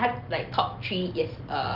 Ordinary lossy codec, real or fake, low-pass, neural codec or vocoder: Opus, 32 kbps; real; 5.4 kHz; none